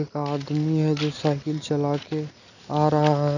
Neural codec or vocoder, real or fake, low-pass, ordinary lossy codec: none; real; 7.2 kHz; none